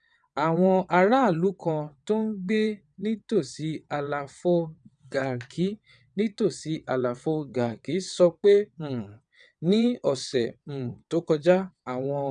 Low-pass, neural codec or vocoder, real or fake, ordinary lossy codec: 9.9 kHz; vocoder, 22.05 kHz, 80 mel bands, WaveNeXt; fake; none